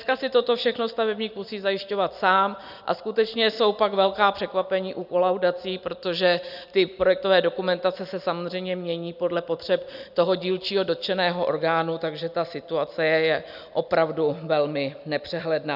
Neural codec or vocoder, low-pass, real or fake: none; 5.4 kHz; real